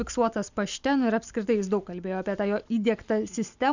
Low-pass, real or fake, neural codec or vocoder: 7.2 kHz; real; none